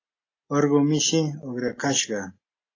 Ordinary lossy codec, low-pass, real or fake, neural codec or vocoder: AAC, 32 kbps; 7.2 kHz; real; none